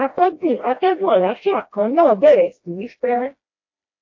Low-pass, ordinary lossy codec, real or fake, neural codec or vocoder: 7.2 kHz; AAC, 48 kbps; fake; codec, 16 kHz, 1 kbps, FreqCodec, smaller model